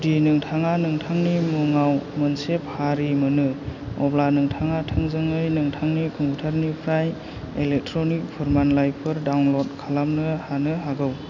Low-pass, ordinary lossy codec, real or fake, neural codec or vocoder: 7.2 kHz; none; real; none